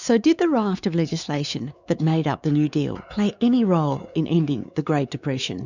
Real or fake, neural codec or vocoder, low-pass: fake; codec, 16 kHz, 4 kbps, X-Codec, WavLM features, trained on Multilingual LibriSpeech; 7.2 kHz